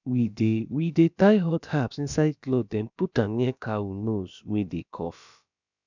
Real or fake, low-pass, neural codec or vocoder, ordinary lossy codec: fake; 7.2 kHz; codec, 16 kHz, about 1 kbps, DyCAST, with the encoder's durations; none